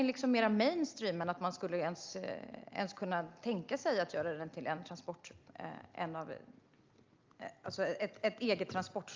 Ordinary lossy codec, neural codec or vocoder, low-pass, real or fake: Opus, 24 kbps; none; 7.2 kHz; real